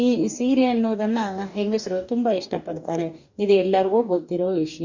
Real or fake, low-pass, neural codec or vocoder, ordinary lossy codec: fake; 7.2 kHz; codec, 44.1 kHz, 2.6 kbps, DAC; Opus, 64 kbps